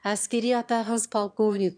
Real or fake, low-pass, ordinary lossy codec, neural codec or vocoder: fake; 9.9 kHz; none; autoencoder, 22.05 kHz, a latent of 192 numbers a frame, VITS, trained on one speaker